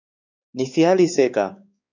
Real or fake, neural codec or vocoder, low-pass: fake; codec, 16 kHz, 4 kbps, X-Codec, WavLM features, trained on Multilingual LibriSpeech; 7.2 kHz